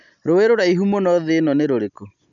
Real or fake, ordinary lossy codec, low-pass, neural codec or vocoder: real; none; 7.2 kHz; none